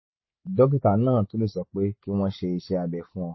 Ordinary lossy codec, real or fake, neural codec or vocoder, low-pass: MP3, 24 kbps; real; none; 7.2 kHz